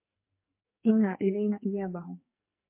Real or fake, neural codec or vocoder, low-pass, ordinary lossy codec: fake; codec, 44.1 kHz, 2.6 kbps, SNAC; 3.6 kHz; MP3, 24 kbps